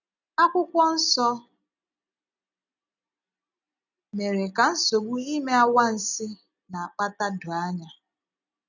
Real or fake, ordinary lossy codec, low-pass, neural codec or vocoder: real; none; 7.2 kHz; none